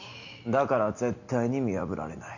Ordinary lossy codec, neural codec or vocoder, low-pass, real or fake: none; none; 7.2 kHz; real